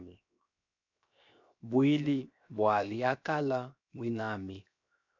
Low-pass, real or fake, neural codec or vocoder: 7.2 kHz; fake; codec, 16 kHz, 0.7 kbps, FocalCodec